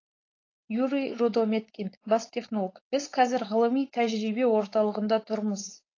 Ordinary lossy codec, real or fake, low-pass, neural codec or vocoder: AAC, 32 kbps; fake; 7.2 kHz; codec, 16 kHz, 4.8 kbps, FACodec